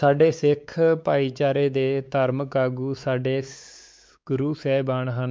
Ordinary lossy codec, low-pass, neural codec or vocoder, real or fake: none; none; codec, 16 kHz, 4 kbps, X-Codec, WavLM features, trained on Multilingual LibriSpeech; fake